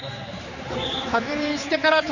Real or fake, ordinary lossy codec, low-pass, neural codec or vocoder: fake; none; 7.2 kHz; codec, 16 kHz, 4 kbps, X-Codec, HuBERT features, trained on general audio